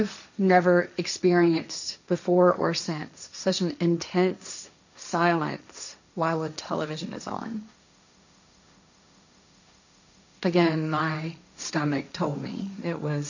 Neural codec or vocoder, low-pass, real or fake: codec, 16 kHz, 1.1 kbps, Voila-Tokenizer; 7.2 kHz; fake